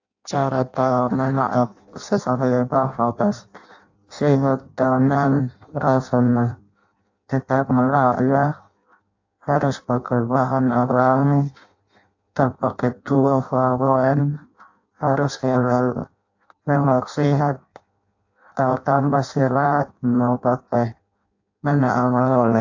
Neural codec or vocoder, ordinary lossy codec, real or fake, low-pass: codec, 16 kHz in and 24 kHz out, 0.6 kbps, FireRedTTS-2 codec; AAC, 48 kbps; fake; 7.2 kHz